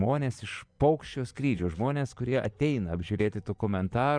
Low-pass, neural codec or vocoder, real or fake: 9.9 kHz; vocoder, 24 kHz, 100 mel bands, Vocos; fake